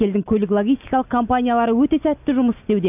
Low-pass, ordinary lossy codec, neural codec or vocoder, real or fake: 3.6 kHz; none; none; real